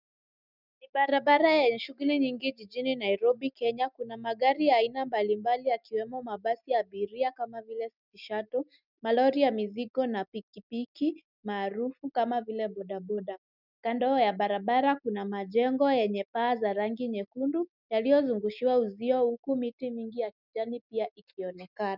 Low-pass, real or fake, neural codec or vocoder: 5.4 kHz; real; none